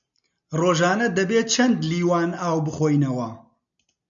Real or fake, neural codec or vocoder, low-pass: real; none; 7.2 kHz